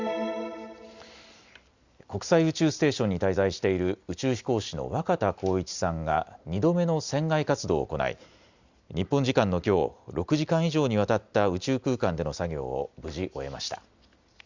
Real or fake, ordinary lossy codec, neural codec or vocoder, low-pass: real; Opus, 64 kbps; none; 7.2 kHz